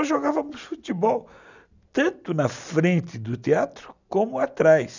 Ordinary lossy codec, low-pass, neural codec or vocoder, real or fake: none; 7.2 kHz; none; real